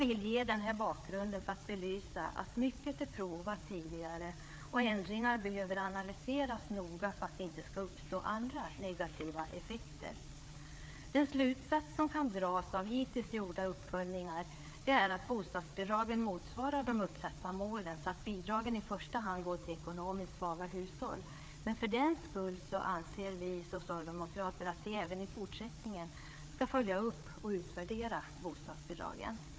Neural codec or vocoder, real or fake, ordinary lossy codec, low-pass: codec, 16 kHz, 4 kbps, FreqCodec, larger model; fake; none; none